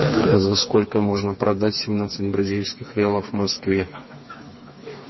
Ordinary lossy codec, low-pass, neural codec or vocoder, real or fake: MP3, 24 kbps; 7.2 kHz; codec, 16 kHz, 4 kbps, FreqCodec, smaller model; fake